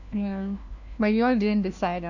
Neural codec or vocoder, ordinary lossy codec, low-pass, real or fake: codec, 16 kHz, 1 kbps, FunCodec, trained on LibriTTS, 50 frames a second; none; 7.2 kHz; fake